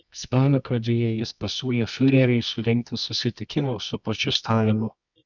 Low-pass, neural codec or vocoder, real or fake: 7.2 kHz; codec, 24 kHz, 0.9 kbps, WavTokenizer, medium music audio release; fake